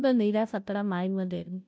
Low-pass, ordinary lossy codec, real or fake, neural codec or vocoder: none; none; fake; codec, 16 kHz, 0.5 kbps, FunCodec, trained on Chinese and English, 25 frames a second